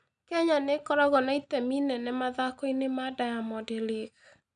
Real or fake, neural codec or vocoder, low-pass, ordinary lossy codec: real; none; 9.9 kHz; none